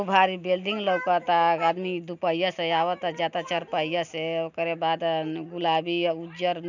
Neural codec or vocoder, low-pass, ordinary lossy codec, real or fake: none; 7.2 kHz; none; real